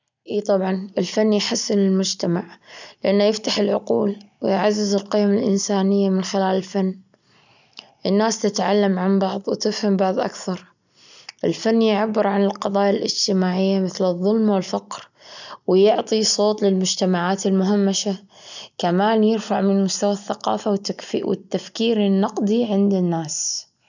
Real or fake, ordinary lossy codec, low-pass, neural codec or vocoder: real; none; 7.2 kHz; none